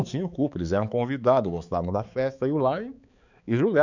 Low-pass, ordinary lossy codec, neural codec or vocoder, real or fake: 7.2 kHz; none; codec, 16 kHz, 4 kbps, X-Codec, HuBERT features, trained on balanced general audio; fake